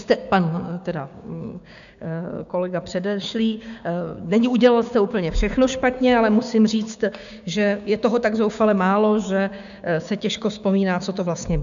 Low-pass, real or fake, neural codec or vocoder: 7.2 kHz; fake; codec, 16 kHz, 6 kbps, DAC